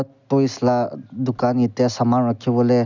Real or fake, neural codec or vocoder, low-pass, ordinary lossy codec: real; none; 7.2 kHz; none